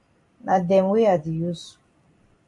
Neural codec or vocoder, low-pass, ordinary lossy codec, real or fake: vocoder, 44.1 kHz, 128 mel bands every 256 samples, BigVGAN v2; 10.8 kHz; MP3, 48 kbps; fake